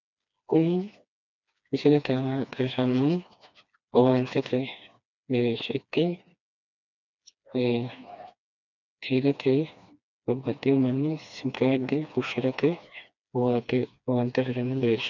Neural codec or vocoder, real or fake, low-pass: codec, 16 kHz, 2 kbps, FreqCodec, smaller model; fake; 7.2 kHz